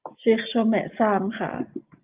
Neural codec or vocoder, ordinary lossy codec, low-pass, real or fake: none; Opus, 32 kbps; 3.6 kHz; real